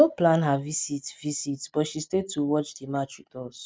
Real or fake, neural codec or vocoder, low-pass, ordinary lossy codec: real; none; none; none